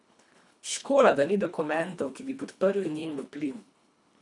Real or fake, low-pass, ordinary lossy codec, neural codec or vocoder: fake; 10.8 kHz; none; codec, 24 kHz, 1.5 kbps, HILCodec